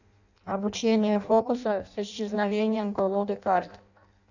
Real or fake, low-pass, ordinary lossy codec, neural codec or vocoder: fake; 7.2 kHz; none; codec, 16 kHz in and 24 kHz out, 0.6 kbps, FireRedTTS-2 codec